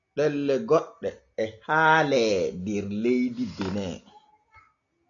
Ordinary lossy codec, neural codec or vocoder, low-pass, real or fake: MP3, 96 kbps; none; 7.2 kHz; real